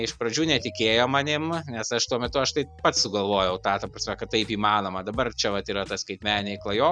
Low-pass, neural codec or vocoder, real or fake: 9.9 kHz; none; real